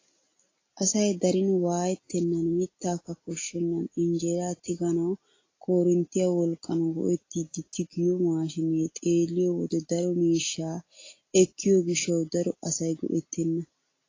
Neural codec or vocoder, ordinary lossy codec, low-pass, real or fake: none; AAC, 32 kbps; 7.2 kHz; real